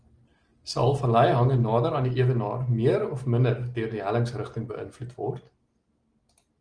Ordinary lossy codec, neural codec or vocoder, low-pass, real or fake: Opus, 24 kbps; none; 9.9 kHz; real